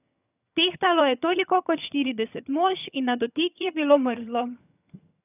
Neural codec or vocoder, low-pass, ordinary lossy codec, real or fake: vocoder, 22.05 kHz, 80 mel bands, HiFi-GAN; 3.6 kHz; none; fake